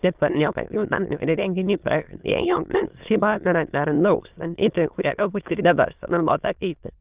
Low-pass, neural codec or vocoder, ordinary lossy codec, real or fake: 3.6 kHz; autoencoder, 22.05 kHz, a latent of 192 numbers a frame, VITS, trained on many speakers; Opus, 24 kbps; fake